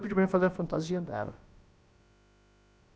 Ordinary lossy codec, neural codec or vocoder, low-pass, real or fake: none; codec, 16 kHz, about 1 kbps, DyCAST, with the encoder's durations; none; fake